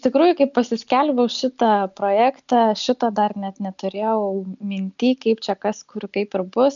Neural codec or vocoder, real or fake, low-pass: none; real; 7.2 kHz